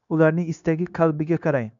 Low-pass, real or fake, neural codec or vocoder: 7.2 kHz; fake; codec, 16 kHz, 0.9 kbps, LongCat-Audio-Codec